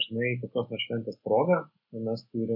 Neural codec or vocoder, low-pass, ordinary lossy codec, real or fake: none; 5.4 kHz; MP3, 32 kbps; real